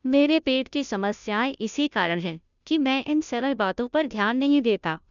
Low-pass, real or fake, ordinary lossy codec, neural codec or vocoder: 7.2 kHz; fake; none; codec, 16 kHz, 0.5 kbps, FunCodec, trained on Chinese and English, 25 frames a second